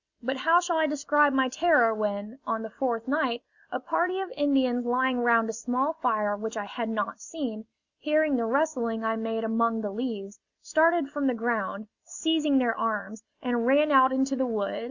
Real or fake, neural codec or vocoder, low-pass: real; none; 7.2 kHz